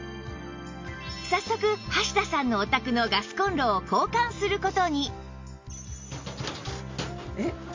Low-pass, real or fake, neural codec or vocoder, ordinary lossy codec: 7.2 kHz; real; none; MP3, 48 kbps